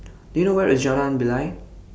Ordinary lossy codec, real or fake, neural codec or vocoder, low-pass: none; real; none; none